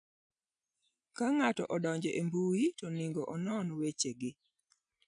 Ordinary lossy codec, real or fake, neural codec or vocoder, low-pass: none; real; none; 9.9 kHz